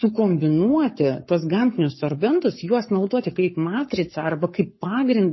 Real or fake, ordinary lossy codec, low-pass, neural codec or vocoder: fake; MP3, 24 kbps; 7.2 kHz; codec, 44.1 kHz, 7.8 kbps, Pupu-Codec